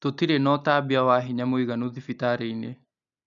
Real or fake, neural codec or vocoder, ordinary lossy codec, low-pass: real; none; MP3, 96 kbps; 7.2 kHz